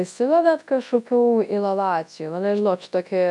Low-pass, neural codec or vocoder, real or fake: 10.8 kHz; codec, 24 kHz, 0.9 kbps, WavTokenizer, large speech release; fake